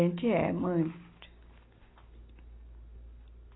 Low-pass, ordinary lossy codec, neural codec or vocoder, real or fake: 7.2 kHz; AAC, 16 kbps; none; real